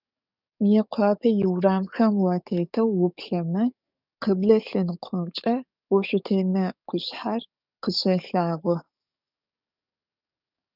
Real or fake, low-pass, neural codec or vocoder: fake; 5.4 kHz; codec, 44.1 kHz, 7.8 kbps, DAC